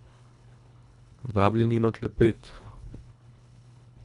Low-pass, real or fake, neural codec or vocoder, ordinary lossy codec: 10.8 kHz; fake; codec, 24 kHz, 1.5 kbps, HILCodec; none